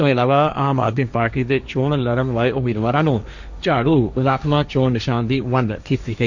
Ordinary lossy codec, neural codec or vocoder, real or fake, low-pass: none; codec, 16 kHz, 1.1 kbps, Voila-Tokenizer; fake; 7.2 kHz